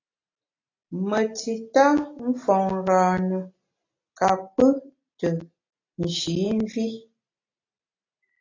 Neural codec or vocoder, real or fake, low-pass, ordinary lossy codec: none; real; 7.2 kHz; AAC, 32 kbps